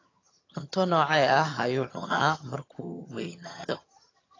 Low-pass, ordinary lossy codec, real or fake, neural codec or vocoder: 7.2 kHz; AAC, 32 kbps; fake; vocoder, 22.05 kHz, 80 mel bands, HiFi-GAN